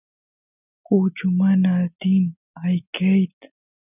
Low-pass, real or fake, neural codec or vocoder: 3.6 kHz; real; none